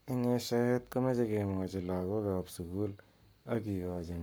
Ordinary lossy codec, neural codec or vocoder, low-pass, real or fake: none; codec, 44.1 kHz, 7.8 kbps, Pupu-Codec; none; fake